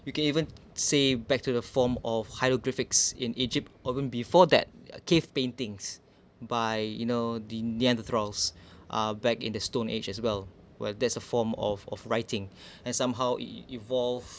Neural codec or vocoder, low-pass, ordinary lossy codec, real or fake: none; none; none; real